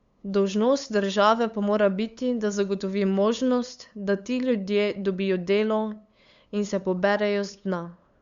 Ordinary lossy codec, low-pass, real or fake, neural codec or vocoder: Opus, 64 kbps; 7.2 kHz; fake; codec, 16 kHz, 8 kbps, FunCodec, trained on LibriTTS, 25 frames a second